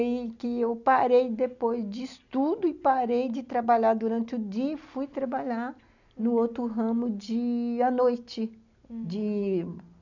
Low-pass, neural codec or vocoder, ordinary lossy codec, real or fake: 7.2 kHz; none; none; real